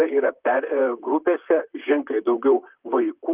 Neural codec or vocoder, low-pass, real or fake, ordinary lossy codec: vocoder, 44.1 kHz, 128 mel bands, Pupu-Vocoder; 3.6 kHz; fake; Opus, 32 kbps